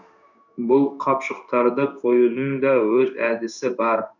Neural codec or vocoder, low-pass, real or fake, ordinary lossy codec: codec, 16 kHz in and 24 kHz out, 1 kbps, XY-Tokenizer; 7.2 kHz; fake; none